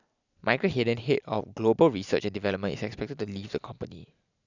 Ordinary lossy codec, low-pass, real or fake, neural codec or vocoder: none; 7.2 kHz; real; none